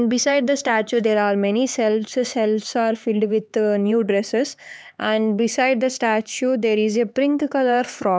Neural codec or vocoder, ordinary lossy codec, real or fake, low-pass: codec, 16 kHz, 4 kbps, X-Codec, HuBERT features, trained on LibriSpeech; none; fake; none